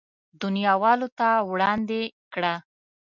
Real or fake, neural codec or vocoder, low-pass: real; none; 7.2 kHz